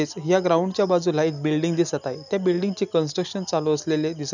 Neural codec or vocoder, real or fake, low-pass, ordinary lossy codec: none; real; 7.2 kHz; none